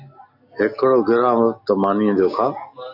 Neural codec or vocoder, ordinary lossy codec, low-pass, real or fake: none; AAC, 48 kbps; 5.4 kHz; real